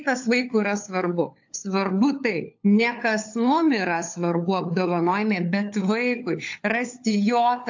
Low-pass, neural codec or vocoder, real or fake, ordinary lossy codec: 7.2 kHz; codec, 16 kHz, 4 kbps, FunCodec, trained on LibriTTS, 50 frames a second; fake; AAC, 48 kbps